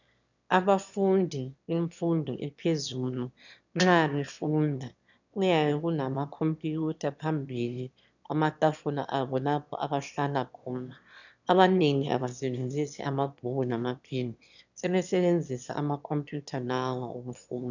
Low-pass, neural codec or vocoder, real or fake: 7.2 kHz; autoencoder, 22.05 kHz, a latent of 192 numbers a frame, VITS, trained on one speaker; fake